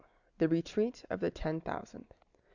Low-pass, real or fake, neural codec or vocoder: 7.2 kHz; real; none